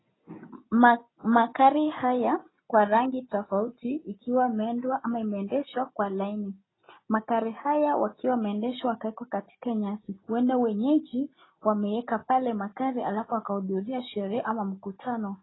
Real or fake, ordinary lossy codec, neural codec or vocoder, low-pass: real; AAC, 16 kbps; none; 7.2 kHz